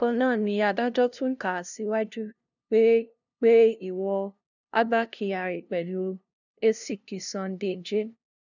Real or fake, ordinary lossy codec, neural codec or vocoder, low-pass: fake; none; codec, 16 kHz, 0.5 kbps, FunCodec, trained on LibriTTS, 25 frames a second; 7.2 kHz